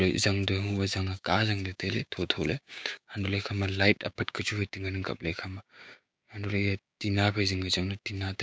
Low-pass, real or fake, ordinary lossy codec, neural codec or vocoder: none; fake; none; codec, 16 kHz, 6 kbps, DAC